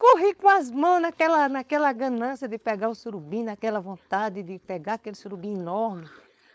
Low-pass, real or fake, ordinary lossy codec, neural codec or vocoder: none; fake; none; codec, 16 kHz, 4.8 kbps, FACodec